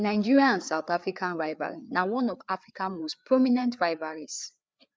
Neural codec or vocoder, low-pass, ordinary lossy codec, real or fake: codec, 16 kHz, 4 kbps, FreqCodec, larger model; none; none; fake